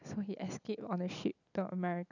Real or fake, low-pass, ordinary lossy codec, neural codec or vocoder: real; 7.2 kHz; none; none